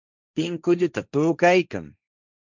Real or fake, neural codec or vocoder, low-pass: fake; codec, 16 kHz, 1.1 kbps, Voila-Tokenizer; 7.2 kHz